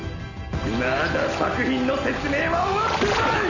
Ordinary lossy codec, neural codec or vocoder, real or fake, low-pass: none; none; real; 7.2 kHz